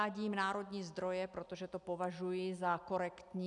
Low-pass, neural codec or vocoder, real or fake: 10.8 kHz; none; real